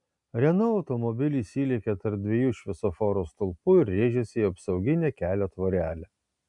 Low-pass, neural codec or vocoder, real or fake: 10.8 kHz; none; real